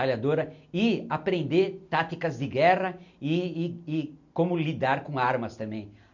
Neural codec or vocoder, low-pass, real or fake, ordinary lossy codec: none; 7.2 kHz; real; none